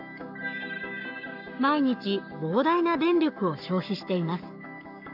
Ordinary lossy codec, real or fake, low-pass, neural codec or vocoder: AAC, 48 kbps; fake; 5.4 kHz; codec, 44.1 kHz, 7.8 kbps, Pupu-Codec